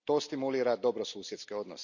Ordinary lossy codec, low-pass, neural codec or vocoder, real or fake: none; 7.2 kHz; none; real